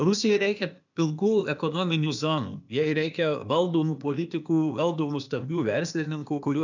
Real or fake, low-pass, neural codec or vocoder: fake; 7.2 kHz; codec, 16 kHz, 0.8 kbps, ZipCodec